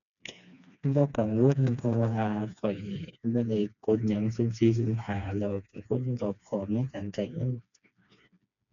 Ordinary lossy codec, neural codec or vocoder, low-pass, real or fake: none; codec, 16 kHz, 2 kbps, FreqCodec, smaller model; 7.2 kHz; fake